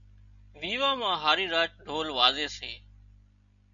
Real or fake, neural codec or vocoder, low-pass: real; none; 7.2 kHz